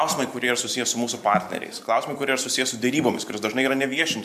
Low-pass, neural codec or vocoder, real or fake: 14.4 kHz; vocoder, 44.1 kHz, 128 mel bands every 512 samples, BigVGAN v2; fake